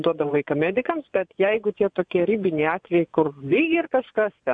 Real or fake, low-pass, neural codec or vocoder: fake; 9.9 kHz; vocoder, 24 kHz, 100 mel bands, Vocos